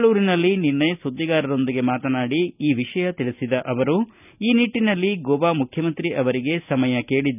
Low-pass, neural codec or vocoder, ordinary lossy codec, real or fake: 3.6 kHz; none; none; real